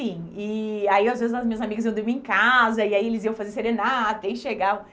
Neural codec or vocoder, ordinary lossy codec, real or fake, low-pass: none; none; real; none